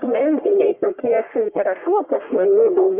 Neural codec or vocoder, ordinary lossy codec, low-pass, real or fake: codec, 44.1 kHz, 1.7 kbps, Pupu-Codec; Opus, 64 kbps; 3.6 kHz; fake